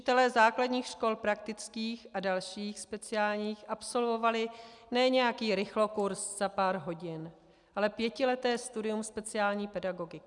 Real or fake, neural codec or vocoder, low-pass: real; none; 10.8 kHz